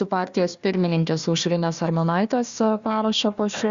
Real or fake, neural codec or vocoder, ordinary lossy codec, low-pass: fake; codec, 16 kHz, 1 kbps, FunCodec, trained on Chinese and English, 50 frames a second; Opus, 64 kbps; 7.2 kHz